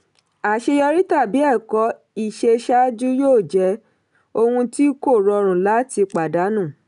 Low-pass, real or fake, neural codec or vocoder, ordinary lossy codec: 10.8 kHz; real; none; none